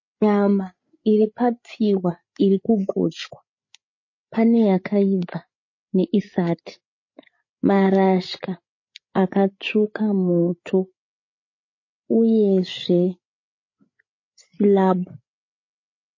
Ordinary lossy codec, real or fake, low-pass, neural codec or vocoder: MP3, 32 kbps; fake; 7.2 kHz; codec, 16 kHz, 8 kbps, FreqCodec, larger model